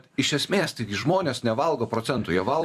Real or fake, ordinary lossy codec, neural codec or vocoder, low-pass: fake; Opus, 64 kbps; vocoder, 44.1 kHz, 128 mel bands every 256 samples, BigVGAN v2; 14.4 kHz